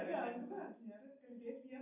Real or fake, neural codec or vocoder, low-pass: real; none; 3.6 kHz